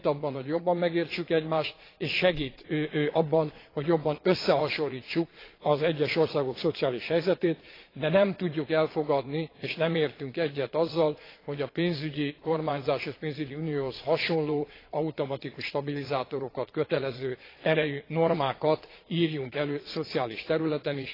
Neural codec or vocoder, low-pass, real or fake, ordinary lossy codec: vocoder, 22.05 kHz, 80 mel bands, Vocos; 5.4 kHz; fake; AAC, 24 kbps